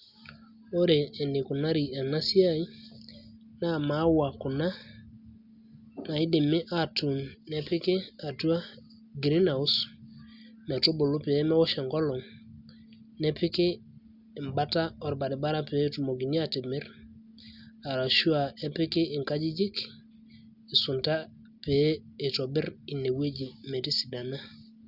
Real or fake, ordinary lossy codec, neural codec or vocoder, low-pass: real; Opus, 64 kbps; none; 5.4 kHz